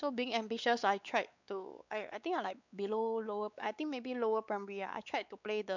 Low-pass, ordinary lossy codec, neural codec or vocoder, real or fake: 7.2 kHz; none; codec, 16 kHz, 4 kbps, X-Codec, WavLM features, trained on Multilingual LibriSpeech; fake